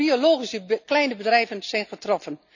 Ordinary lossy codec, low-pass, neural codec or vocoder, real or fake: none; 7.2 kHz; none; real